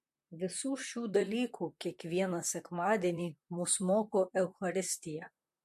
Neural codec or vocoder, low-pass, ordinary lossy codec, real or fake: vocoder, 44.1 kHz, 128 mel bands, Pupu-Vocoder; 14.4 kHz; MP3, 64 kbps; fake